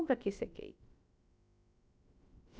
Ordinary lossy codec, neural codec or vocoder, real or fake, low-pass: none; codec, 16 kHz, about 1 kbps, DyCAST, with the encoder's durations; fake; none